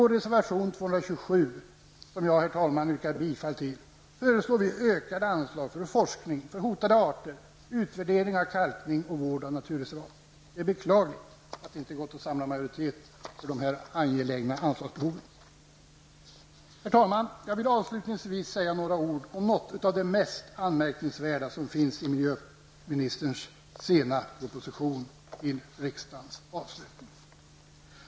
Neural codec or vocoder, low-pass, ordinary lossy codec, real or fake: none; none; none; real